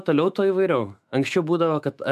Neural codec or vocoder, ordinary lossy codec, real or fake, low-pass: autoencoder, 48 kHz, 128 numbers a frame, DAC-VAE, trained on Japanese speech; MP3, 96 kbps; fake; 14.4 kHz